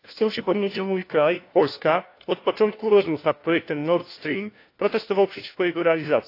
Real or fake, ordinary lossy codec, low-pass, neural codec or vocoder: fake; AAC, 32 kbps; 5.4 kHz; codec, 16 kHz, 1 kbps, FunCodec, trained on LibriTTS, 50 frames a second